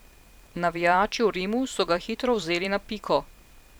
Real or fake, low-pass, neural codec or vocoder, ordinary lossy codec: fake; none; vocoder, 44.1 kHz, 128 mel bands every 512 samples, BigVGAN v2; none